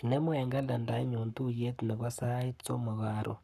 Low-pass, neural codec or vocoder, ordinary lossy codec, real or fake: 14.4 kHz; codec, 44.1 kHz, 7.8 kbps, Pupu-Codec; none; fake